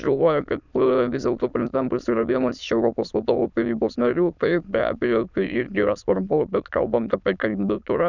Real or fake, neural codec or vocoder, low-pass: fake; autoencoder, 22.05 kHz, a latent of 192 numbers a frame, VITS, trained on many speakers; 7.2 kHz